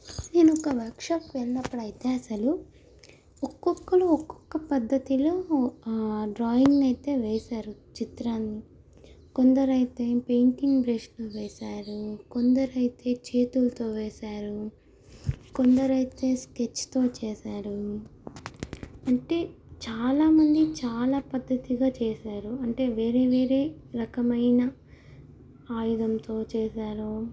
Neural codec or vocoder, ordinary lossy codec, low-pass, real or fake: none; none; none; real